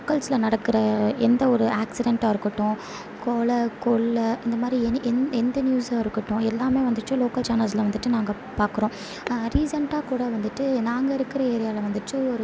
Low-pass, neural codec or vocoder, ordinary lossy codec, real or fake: none; none; none; real